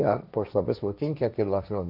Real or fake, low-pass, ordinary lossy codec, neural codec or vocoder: fake; 5.4 kHz; none; codec, 16 kHz, 1.1 kbps, Voila-Tokenizer